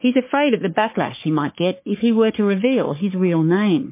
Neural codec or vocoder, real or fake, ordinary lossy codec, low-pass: codec, 44.1 kHz, 3.4 kbps, Pupu-Codec; fake; MP3, 24 kbps; 3.6 kHz